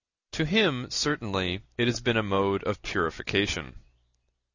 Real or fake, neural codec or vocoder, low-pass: real; none; 7.2 kHz